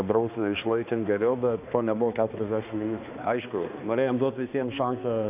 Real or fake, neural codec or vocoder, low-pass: fake; codec, 16 kHz, 2 kbps, X-Codec, HuBERT features, trained on balanced general audio; 3.6 kHz